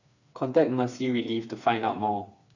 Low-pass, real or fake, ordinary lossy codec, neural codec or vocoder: 7.2 kHz; fake; none; codec, 16 kHz, 4 kbps, FreqCodec, smaller model